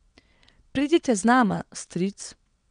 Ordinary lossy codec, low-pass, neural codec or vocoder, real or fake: none; 9.9 kHz; vocoder, 22.05 kHz, 80 mel bands, WaveNeXt; fake